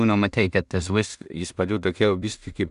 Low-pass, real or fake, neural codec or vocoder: 10.8 kHz; fake; codec, 16 kHz in and 24 kHz out, 0.4 kbps, LongCat-Audio-Codec, two codebook decoder